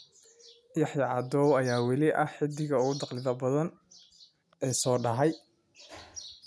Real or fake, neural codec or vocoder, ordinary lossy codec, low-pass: real; none; none; none